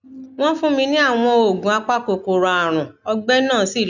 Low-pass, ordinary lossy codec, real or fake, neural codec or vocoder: 7.2 kHz; none; real; none